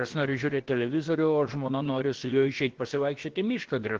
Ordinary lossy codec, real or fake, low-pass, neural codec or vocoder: Opus, 16 kbps; fake; 7.2 kHz; codec, 16 kHz, about 1 kbps, DyCAST, with the encoder's durations